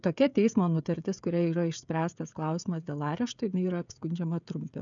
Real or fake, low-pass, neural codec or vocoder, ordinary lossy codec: fake; 7.2 kHz; codec, 16 kHz, 16 kbps, FreqCodec, smaller model; MP3, 96 kbps